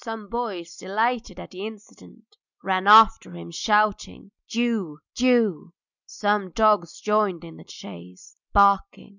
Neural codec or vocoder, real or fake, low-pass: none; real; 7.2 kHz